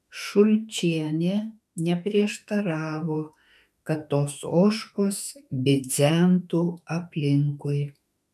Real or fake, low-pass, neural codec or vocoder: fake; 14.4 kHz; autoencoder, 48 kHz, 32 numbers a frame, DAC-VAE, trained on Japanese speech